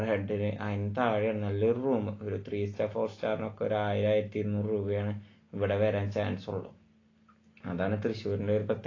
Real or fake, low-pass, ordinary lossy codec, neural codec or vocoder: real; 7.2 kHz; AAC, 32 kbps; none